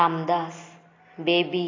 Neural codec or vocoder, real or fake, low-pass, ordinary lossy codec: none; real; 7.2 kHz; AAC, 32 kbps